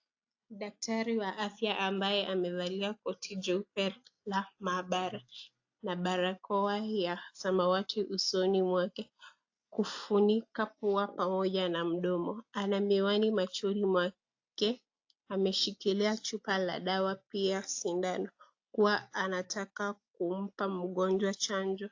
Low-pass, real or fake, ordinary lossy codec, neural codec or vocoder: 7.2 kHz; real; AAC, 48 kbps; none